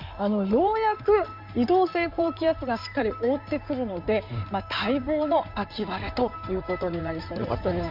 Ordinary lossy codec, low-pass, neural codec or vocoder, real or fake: none; 5.4 kHz; codec, 16 kHz in and 24 kHz out, 2.2 kbps, FireRedTTS-2 codec; fake